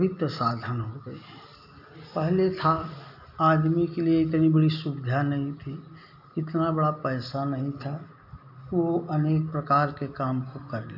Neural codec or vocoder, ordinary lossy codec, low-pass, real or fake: none; none; 5.4 kHz; real